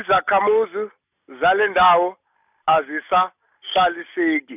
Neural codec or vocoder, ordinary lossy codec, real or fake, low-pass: none; none; real; 3.6 kHz